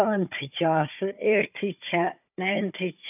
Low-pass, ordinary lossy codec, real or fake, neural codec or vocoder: 3.6 kHz; none; fake; codec, 16 kHz, 4 kbps, FunCodec, trained on Chinese and English, 50 frames a second